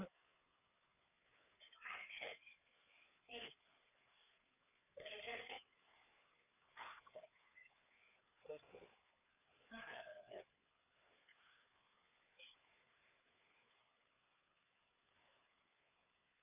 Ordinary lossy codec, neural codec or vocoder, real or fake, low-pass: none; codec, 16 kHz, 4 kbps, FreqCodec, larger model; fake; 3.6 kHz